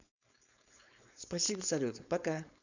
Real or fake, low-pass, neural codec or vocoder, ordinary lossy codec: fake; 7.2 kHz; codec, 16 kHz, 4.8 kbps, FACodec; none